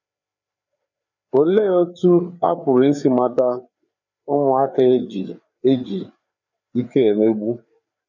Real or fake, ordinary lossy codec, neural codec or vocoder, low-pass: fake; none; codec, 16 kHz, 4 kbps, FreqCodec, larger model; 7.2 kHz